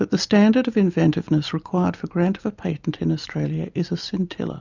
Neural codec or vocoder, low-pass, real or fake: none; 7.2 kHz; real